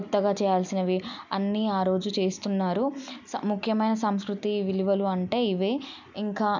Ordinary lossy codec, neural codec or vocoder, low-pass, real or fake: none; none; 7.2 kHz; real